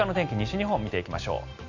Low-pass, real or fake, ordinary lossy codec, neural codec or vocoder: 7.2 kHz; real; none; none